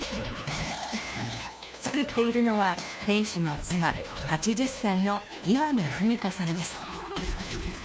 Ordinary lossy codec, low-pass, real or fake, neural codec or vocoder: none; none; fake; codec, 16 kHz, 1 kbps, FunCodec, trained on LibriTTS, 50 frames a second